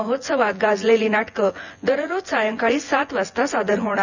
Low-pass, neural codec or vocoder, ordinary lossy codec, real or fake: 7.2 kHz; vocoder, 24 kHz, 100 mel bands, Vocos; none; fake